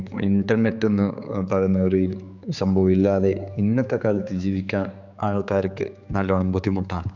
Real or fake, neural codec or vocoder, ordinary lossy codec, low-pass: fake; codec, 16 kHz, 4 kbps, X-Codec, HuBERT features, trained on general audio; none; 7.2 kHz